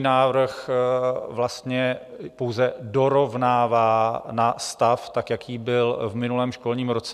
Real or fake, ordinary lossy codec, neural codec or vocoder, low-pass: real; MP3, 96 kbps; none; 14.4 kHz